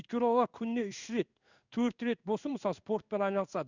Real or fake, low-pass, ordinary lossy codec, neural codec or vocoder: fake; 7.2 kHz; none; codec, 16 kHz in and 24 kHz out, 1 kbps, XY-Tokenizer